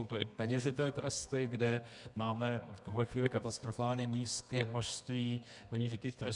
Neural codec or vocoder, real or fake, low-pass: codec, 24 kHz, 0.9 kbps, WavTokenizer, medium music audio release; fake; 10.8 kHz